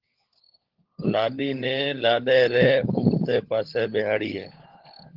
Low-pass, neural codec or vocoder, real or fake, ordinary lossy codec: 5.4 kHz; codec, 16 kHz, 16 kbps, FunCodec, trained on LibriTTS, 50 frames a second; fake; Opus, 16 kbps